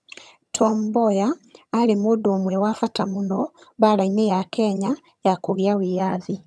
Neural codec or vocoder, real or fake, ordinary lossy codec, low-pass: vocoder, 22.05 kHz, 80 mel bands, HiFi-GAN; fake; none; none